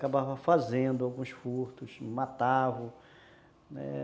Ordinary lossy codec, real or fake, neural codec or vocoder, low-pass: none; real; none; none